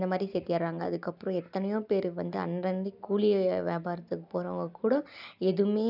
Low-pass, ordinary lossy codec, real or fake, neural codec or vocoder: 5.4 kHz; none; real; none